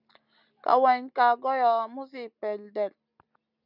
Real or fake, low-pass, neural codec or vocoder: real; 5.4 kHz; none